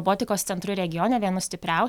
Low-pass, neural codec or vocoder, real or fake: 19.8 kHz; none; real